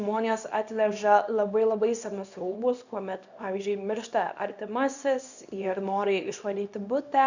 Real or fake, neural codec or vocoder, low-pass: fake; codec, 24 kHz, 0.9 kbps, WavTokenizer, small release; 7.2 kHz